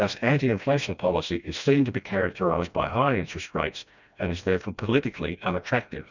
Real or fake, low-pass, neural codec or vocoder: fake; 7.2 kHz; codec, 16 kHz, 1 kbps, FreqCodec, smaller model